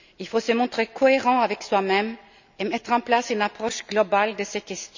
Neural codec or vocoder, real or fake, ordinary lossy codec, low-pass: none; real; none; 7.2 kHz